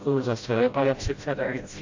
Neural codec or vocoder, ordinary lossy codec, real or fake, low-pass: codec, 16 kHz, 0.5 kbps, FreqCodec, smaller model; AAC, 48 kbps; fake; 7.2 kHz